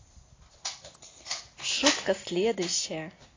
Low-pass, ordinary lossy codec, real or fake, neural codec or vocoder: 7.2 kHz; AAC, 32 kbps; real; none